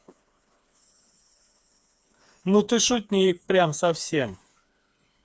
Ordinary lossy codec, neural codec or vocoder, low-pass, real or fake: none; codec, 16 kHz, 4 kbps, FreqCodec, smaller model; none; fake